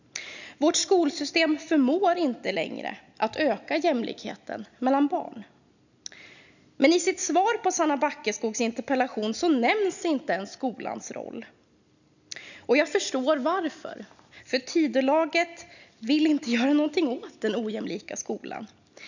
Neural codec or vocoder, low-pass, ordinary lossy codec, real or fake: none; 7.2 kHz; none; real